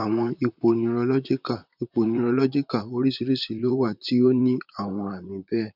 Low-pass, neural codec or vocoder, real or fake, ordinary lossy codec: 5.4 kHz; vocoder, 44.1 kHz, 128 mel bands, Pupu-Vocoder; fake; none